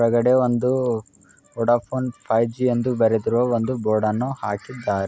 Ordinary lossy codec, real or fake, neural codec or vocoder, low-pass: none; real; none; none